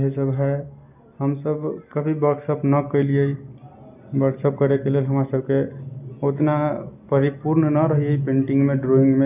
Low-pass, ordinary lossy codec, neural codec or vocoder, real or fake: 3.6 kHz; none; none; real